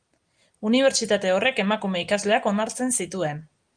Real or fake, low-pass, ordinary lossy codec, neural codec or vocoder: real; 9.9 kHz; Opus, 32 kbps; none